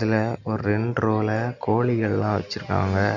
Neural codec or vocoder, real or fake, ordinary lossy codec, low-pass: none; real; none; 7.2 kHz